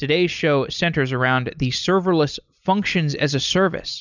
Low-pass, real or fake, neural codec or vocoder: 7.2 kHz; real; none